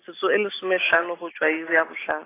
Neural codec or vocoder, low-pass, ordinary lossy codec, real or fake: none; 3.6 kHz; AAC, 16 kbps; real